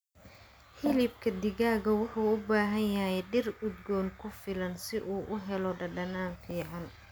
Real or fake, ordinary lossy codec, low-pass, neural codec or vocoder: real; none; none; none